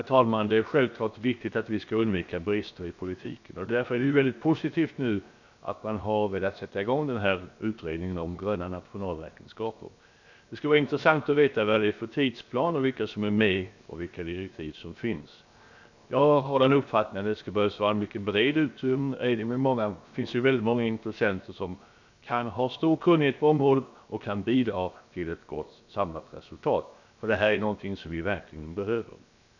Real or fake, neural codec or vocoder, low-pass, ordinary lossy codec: fake; codec, 16 kHz, 0.7 kbps, FocalCodec; 7.2 kHz; none